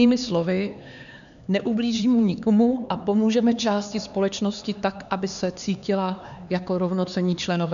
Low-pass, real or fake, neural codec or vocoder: 7.2 kHz; fake; codec, 16 kHz, 4 kbps, X-Codec, HuBERT features, trained on LibriSpeech